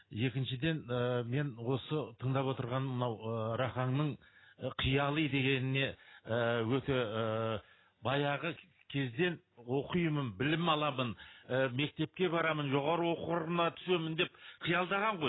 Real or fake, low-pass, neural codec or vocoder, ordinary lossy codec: real; 7.2 kHz; none; AAC, 16 kbps